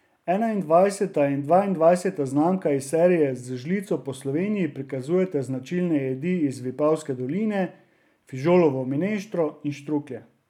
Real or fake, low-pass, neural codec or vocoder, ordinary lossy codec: real; 19.8 kHz; none; none